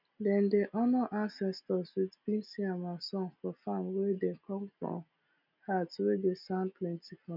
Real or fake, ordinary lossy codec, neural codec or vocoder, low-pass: real; none; none; 5.4 kHz